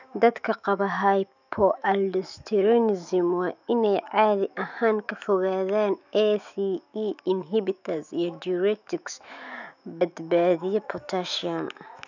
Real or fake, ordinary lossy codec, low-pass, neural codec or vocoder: fake; none; 7.2 kHz; autoencoder, 48 kHz, 128 numbers a frame, DAC-VAE, trained on Japanese speech